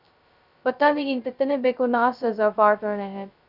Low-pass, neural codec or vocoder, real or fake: 5.4 kHz; codec, 16 kHz, 0.2 kbps, FocalCodec; fake